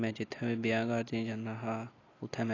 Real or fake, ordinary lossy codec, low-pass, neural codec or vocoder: real; AAC, 32 kbps; 7.2 kHz; none